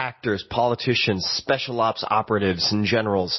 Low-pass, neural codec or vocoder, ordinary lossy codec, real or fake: 7.2 kHz; none; MP3, 24 kbps; real